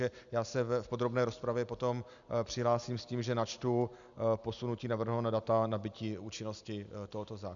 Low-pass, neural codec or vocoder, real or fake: 7.2 kHz; none; real